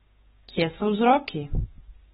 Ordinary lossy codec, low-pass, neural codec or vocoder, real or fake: AAC, 16 kbps; 7.2 kHz; codec, 16 kHz, 6 kbps, DAC; fake